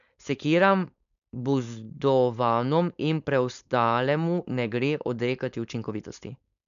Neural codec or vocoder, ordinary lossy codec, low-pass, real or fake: codec, 16 kHz, 4.8 kbps, FACodec; none; 7.2 kHz; fake